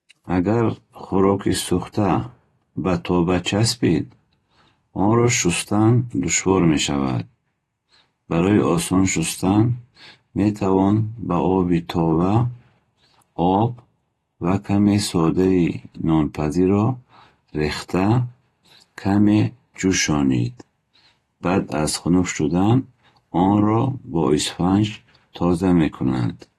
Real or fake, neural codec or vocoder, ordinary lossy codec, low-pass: real; none; AAC, 32 kbps; 19.8 kHz